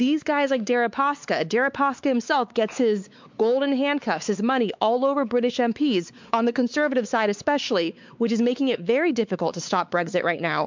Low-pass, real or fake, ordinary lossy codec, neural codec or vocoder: 7.2 kHz; fake; MP3, 64 kbps; codec, 16 kHz, 4 kbps, X-Codec, WavLM features, trained on Multilingual LibriSpeech